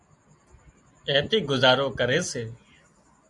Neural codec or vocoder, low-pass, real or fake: none; 10.8 kHz; real